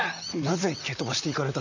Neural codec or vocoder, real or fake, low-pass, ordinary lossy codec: vocoder, 44.1 kHz, 128 mel bands, Pupu-Vocoder; fake; 7.2 kHz; none